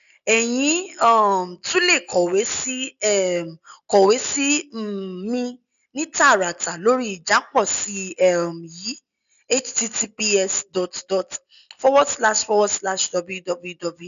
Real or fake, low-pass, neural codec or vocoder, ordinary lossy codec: real; 7.2 kHz; none; none